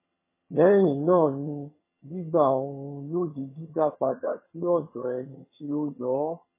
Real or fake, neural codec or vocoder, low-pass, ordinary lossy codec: fake; vocoder, 22.05 kHz, 80 mel bands, HiFi-GAN; 3.6 kHz; MP3, 16 kbps